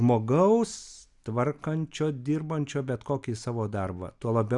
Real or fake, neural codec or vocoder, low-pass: real; none; 10.8 kHz